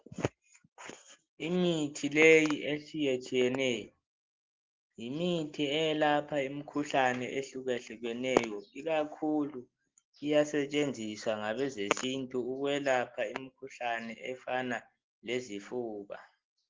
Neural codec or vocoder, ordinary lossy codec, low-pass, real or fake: none; Opus, 16 kbps; 7.2 kHz; real